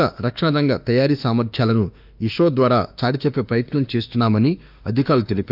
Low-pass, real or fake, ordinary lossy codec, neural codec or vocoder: 5.4 kHz; fake; none; autoencoder, 48 kHz, 32 numbers a frame, DAC-VAE, trained on Japanese speech